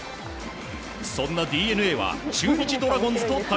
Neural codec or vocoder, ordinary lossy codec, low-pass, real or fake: none; none; none; real